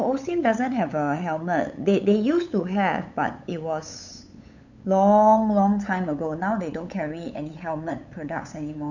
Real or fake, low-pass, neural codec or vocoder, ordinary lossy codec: fake; 7.2 kHz; codec, 16 kHz, 8 kbps, FunCodec, trained on LibriTTS, 25 frames a second; none